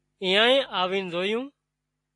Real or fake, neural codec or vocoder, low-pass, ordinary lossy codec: real; none; 10.8 kHz; AAC, 64 kbps